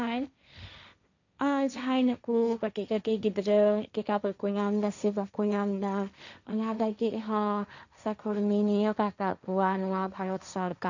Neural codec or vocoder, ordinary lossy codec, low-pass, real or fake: codec, 16 kHz, 1.1 kbps, Voila-Tokenizer; none; 7.2 kHz; fake